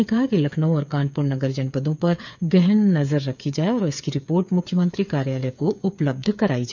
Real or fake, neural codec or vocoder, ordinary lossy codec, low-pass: fake; codec, 16 kHz, 8 kbps, FreqCodec, smaller model; none; 7.2 kHz